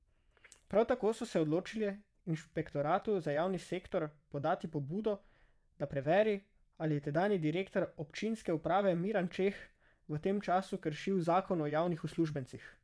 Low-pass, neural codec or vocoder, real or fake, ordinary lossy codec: none; vocoder, 22.05 kHz, 80 mel bands, WaveNeXt; fake; none